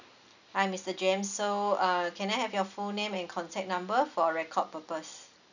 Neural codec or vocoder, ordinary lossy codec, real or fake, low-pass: none; none; real; 7.2 kHz